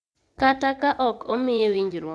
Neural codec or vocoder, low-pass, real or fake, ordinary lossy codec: vocoder, 22.05 kHz, 80 mel bands, WaveNeXt; none; fake; none